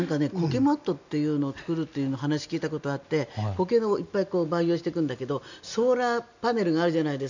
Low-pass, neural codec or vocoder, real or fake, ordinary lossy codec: 7.2 kHz; none; real; none